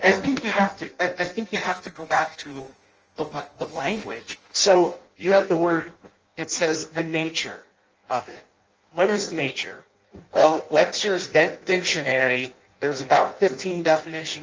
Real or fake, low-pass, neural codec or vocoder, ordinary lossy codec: fake; 7.2 kHz; codec, 16 kHz in and 24 kHz out, 0.6 kbps, FireRedTTS-2 codec; Opus, 24 kbps